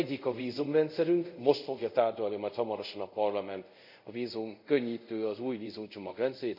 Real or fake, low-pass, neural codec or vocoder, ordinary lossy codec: fake; 5.4 kHz; codec, 24 kHz, 0.5 kbps, DualCodec; none